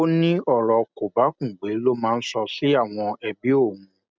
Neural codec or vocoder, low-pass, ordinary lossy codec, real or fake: none; none; none; real